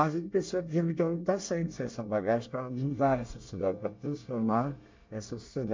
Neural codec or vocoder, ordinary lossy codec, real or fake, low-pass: codec, 24 kHz, 1 kbps, SNAC; MP3, 64 kbps; fake; 7.2 kHz